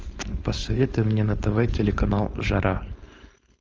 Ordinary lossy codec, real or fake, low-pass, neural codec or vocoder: Opus, 24 kbps; fake; 7.2 kHz; codec, 16 kHz, 4.8 kbps, FACodec